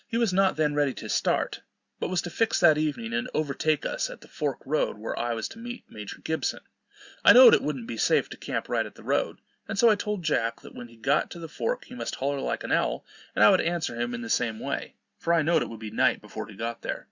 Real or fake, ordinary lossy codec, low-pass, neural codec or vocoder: real; Opus, 64 kbps; 7.2 kHz; none